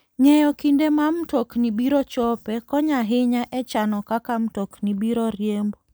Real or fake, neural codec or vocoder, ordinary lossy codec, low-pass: real; none; none; none